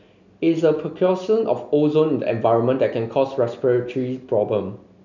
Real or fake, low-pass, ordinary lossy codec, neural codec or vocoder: real; 7.2 kHz; none; none